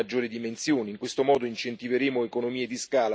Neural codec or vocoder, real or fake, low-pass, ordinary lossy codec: none; real; none; none